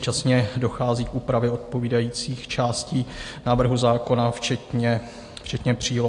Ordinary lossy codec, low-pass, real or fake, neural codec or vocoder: AAC, 48 kbps; 10.8 kHz; real; none